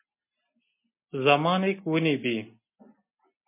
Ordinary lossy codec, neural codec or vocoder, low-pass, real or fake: MP3, 24 kbps; none; 3.6 kHz; real